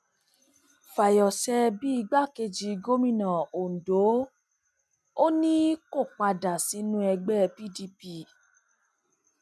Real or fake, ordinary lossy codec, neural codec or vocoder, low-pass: real; none; none; none